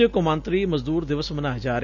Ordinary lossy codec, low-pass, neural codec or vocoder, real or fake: none; 7.2 kHz; none; real